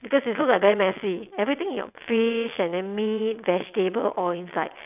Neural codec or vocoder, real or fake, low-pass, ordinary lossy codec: vocoder, 22.05 kHz, 80 mel bands, WaveNeXt; fake; 3.6 kHz; none